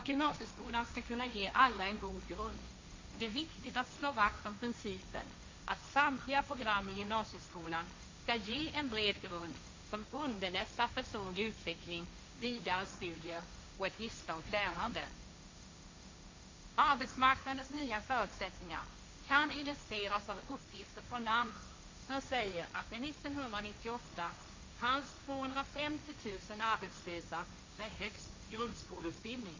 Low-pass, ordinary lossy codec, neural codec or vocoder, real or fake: 7.2 kHz; MP3, 48 kbps; codec, 16 kHz, 1.1 kbps, Voila-Tokenizer; fake